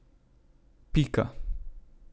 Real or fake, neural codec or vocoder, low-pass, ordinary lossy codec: real; none; none; none